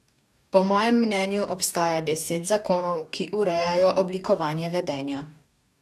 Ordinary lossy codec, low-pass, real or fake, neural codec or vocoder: none; 14.4 kHz; fake; codec, 44.1 kHz, 2.6 kbps, DAC